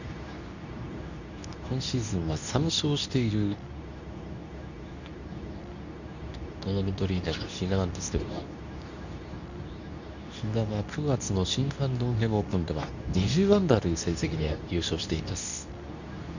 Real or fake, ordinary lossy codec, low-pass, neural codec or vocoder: fake; none; 7.2 kHz; codec, 24 kHz, 0.9 kbps, WavTokenizer, medium speech release version 2